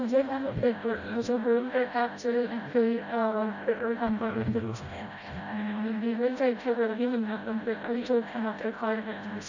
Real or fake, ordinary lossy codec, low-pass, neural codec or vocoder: fake; none; 7.2 kHz; codec, 16 kHz, 0.5 kbps, FreqCodec, smaller model